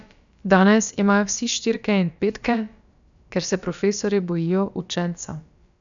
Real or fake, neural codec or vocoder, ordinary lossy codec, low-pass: fake; codec, 16 kHz, about 1 kbps, DyCAST, with the encoder's durations; none; 7.2 kHz